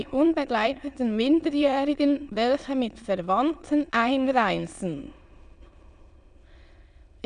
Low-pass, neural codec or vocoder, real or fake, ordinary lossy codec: 9.9 kHz; autoencoder, 22.05 kHz, a latent of 192 numbers a frame, VITS, trained on many speakers; fake; none